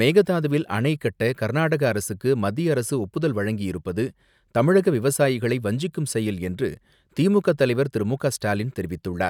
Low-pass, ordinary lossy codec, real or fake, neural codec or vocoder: 19.8 kHz; none; real; none